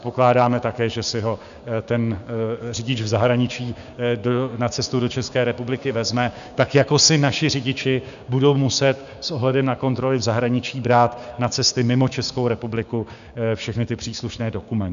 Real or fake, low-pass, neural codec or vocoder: fake; 7.2 kHz; codec, 16 kHz, 6 kbps, DAC